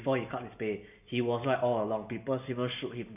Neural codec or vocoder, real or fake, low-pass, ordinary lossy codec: none; real; 3.6 kHz; none